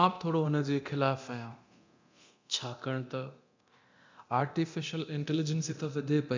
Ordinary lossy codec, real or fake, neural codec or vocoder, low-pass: MP3, 64 kbps; fake; codec, 24 kHz, 0.9 kbps, DualCodec; 7.2 kHz